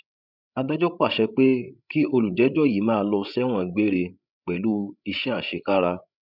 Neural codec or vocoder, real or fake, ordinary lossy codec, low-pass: codec, 16 kHz, 16 kbps, FreqCodec, larger model; fake; none; 5.4 kHz